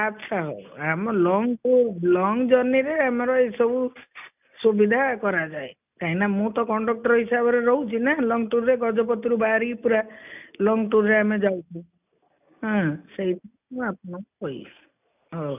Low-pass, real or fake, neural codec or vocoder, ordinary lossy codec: 3.6 kHz; real; none; none